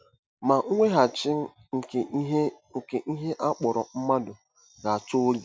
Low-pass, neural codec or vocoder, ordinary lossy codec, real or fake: none; none; none; real